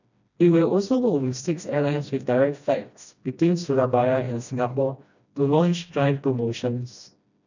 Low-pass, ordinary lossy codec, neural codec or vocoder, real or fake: 7.2 kHz; none; codec, 16 kHz, 1 kbps, FreqCodec, smaller model; fake